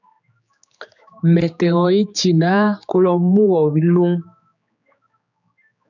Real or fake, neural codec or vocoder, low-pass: fake; codec, 16 kHz, 4 kbps, X-Codec, HuBERT features, trained on general audio; 7.2 kHz